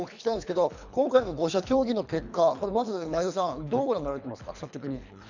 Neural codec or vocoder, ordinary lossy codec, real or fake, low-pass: codec, 24 kHz, 3 kbps, HILCodec; none; fake; 7.2 kHz